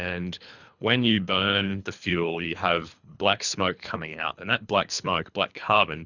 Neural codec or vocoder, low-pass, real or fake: codec, 24 kHz, 3 kbps, HILCodec; 7.2 kHz; fake